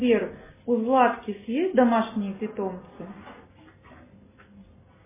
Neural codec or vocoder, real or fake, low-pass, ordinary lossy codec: none; real; 3.6 kHz; MP3, 16 kbps